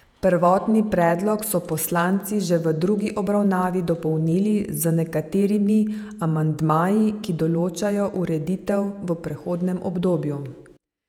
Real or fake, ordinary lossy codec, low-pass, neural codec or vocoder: fake; none; 19.8 kHz; vocoder, 48 kHz, 128 mel bands, Vocos